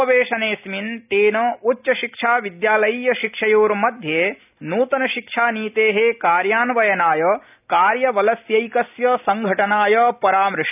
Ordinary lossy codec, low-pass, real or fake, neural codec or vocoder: none; 3.6 kHz; real; none